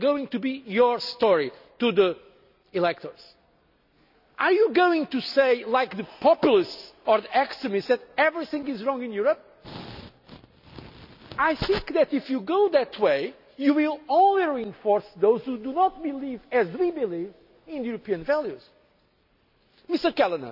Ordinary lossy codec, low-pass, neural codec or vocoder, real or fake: none; 5.4 kHz; none; real